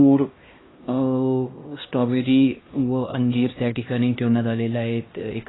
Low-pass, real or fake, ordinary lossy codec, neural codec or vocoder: 7.2 kHz; fake; AAC, 16 kbps; codec, 16 kHz, 1 kbps, X-Codec, HuBERT features, trained on LibriSpeech